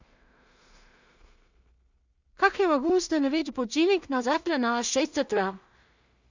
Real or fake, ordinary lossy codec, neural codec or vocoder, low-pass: fake; Opus, 64 kbps; codec, 16 kHz in and 24 kHz out, 0.4 kbps, LongCat-Audio-Codec, two codebook decoder; 7.2 kHz